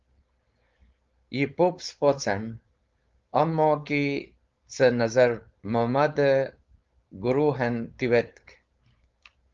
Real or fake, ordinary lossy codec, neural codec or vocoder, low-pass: fake; Opus, 32 kbps; codec, 16 kHz, 4.8 kbps, FACodec; 7.2 kHz